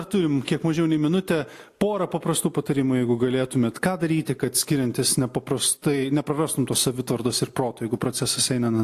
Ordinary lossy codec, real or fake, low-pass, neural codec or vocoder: AAC, 64 kbps; real; 14.4 kHz; none